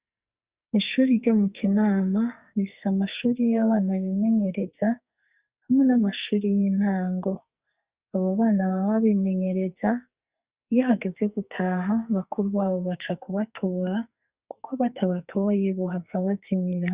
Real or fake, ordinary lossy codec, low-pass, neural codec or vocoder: fake; Opus, 64 kbps; 3.6 kHz; codec, 44.1 kHz, 2.6 kbps, SNAC